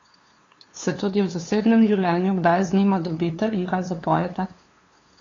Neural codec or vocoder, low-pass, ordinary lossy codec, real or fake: codec, 16 kHz, 2 kbps, FunCodec, trained on LibriTTS, 25 frames a second; 7.2 kHz; AAC, 32 kbps; fake